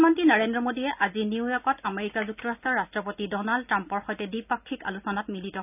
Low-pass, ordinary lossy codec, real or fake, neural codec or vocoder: 3.6 kHz; none; real; none